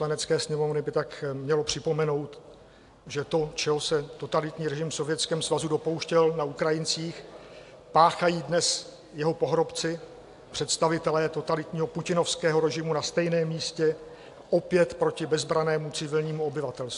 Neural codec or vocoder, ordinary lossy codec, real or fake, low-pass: none; AAC, 64 kbps; real; 10.8 kHz